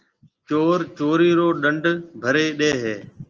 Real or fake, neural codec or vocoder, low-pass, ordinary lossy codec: real; none; 7.2 kHz; Opus, 24 kbps